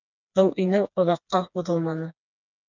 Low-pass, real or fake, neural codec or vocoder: 7.2 kHz; fake; codec, 16 kHz, 2 kbps, FreqCodec, smaller model